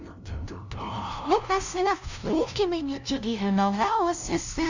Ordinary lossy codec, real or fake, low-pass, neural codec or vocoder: none; fake; 7.2 kHz; codec, 16 kHz, 0.5 kbps, FunCodec, trained on LibriTTS, 25 frames a second